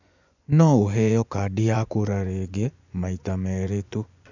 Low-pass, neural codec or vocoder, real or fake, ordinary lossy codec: 7.2 kHz; vocoder, 24 kHz, 100 mel bands, Vocos; fake; none